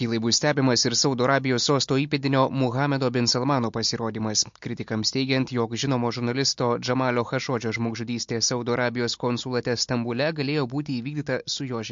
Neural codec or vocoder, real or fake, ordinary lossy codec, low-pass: none; real; MP3, 48 kbps; 7.2 kHz